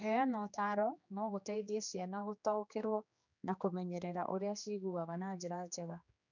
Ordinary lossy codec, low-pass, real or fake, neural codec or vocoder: none; 7.2 kHz; fake; codec, 16 kHz, 2 kbps, X-Codec, HuBERT features, trained on general audio